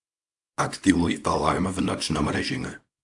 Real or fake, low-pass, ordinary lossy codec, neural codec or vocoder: fake; 10.8 kHz; AAC, 64 kbps; codec, 24 kHz, 0.9 kbps, WavTokenizer, small release